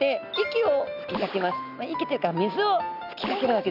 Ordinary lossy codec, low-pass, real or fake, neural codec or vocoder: none; 5.4 kHz; real; none